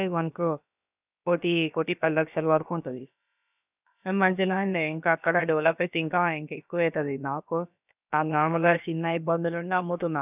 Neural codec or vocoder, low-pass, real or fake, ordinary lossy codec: codec, 16 kHz, about 1 kbps, DyCAST, with the encoder's durations; 3.6 kHz; fake; none